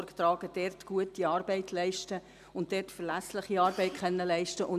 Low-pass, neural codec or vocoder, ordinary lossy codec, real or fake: 14.4 kHz; none; MP3, 96 kbps; real